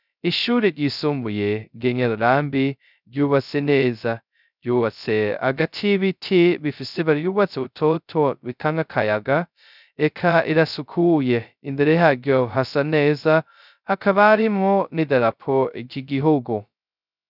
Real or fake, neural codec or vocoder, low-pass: fake; codec, 16 kHz, 0.2 kbps, FocalCodec; 5.4 kHz